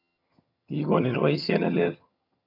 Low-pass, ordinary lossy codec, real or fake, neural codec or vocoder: 5.4 kHz; none; fake; vocoder, 22.05 kHz, 80 mel bands, HiFi-GAN